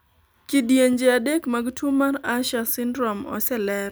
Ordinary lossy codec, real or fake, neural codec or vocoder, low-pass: none; real; none; none